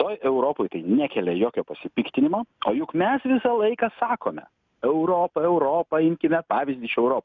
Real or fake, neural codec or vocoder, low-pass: real; none; 7.2 kHz